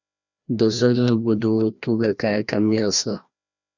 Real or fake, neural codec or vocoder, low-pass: fake; codec, 16 kHz, 1 kbps, FreqCodec, larger model; 7.2 kHz